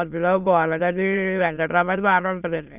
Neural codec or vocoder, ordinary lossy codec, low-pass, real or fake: autoencoder, 22.05 kHz, a latent of 192 numbers a frame, VITS, trained on many speakers; none; 3.6 kHz; fake